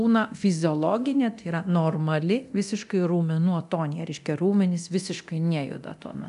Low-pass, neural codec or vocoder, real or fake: 10.8 kHz; codec, 24 kHz, 0.9 kbps, DualCodec; fake